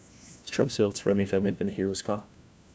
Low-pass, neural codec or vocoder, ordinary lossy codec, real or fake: none; codec, 16 kHz, 1 kbps, FunCodec, trained on LibriTTS, 50 frames a second; none; fake